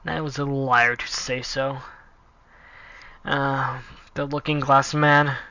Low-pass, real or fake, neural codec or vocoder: 7.2 kHz; real; none